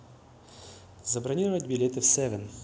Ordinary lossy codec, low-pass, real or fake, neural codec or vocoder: none; none; real; none